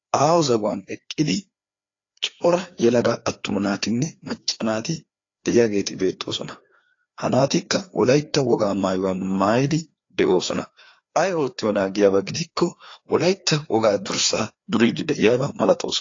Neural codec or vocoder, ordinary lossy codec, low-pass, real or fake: codec, 16 kHz, 2 kbps, FreqCodec, larger model; AAC, 32 kbps; 7.2 kHz; fake